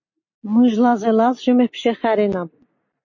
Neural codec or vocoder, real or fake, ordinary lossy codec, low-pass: none; real; MP3, 32 kbps; 7.2 kHz